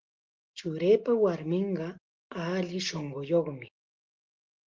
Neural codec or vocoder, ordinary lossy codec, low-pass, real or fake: none; Opus, 16 kbps; 7.2 kHz; real